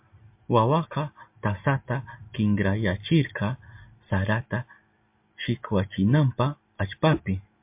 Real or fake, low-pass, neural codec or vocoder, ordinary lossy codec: real; 3.6 kHz; none; MP3, 32 kbps